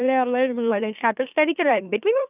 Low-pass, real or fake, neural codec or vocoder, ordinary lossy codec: 3.6 kHz; fake; autoencoder, 44.1 kHz, a latent of 192 numbers a frame, MeloTTS; none